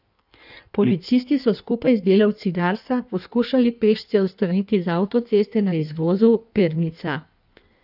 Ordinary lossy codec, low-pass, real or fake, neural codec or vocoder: none; 5.4 kHz; fake; codec, 16 kHz in and 24 kHz out, 1.1 kbps, FireRedTTS-2 codec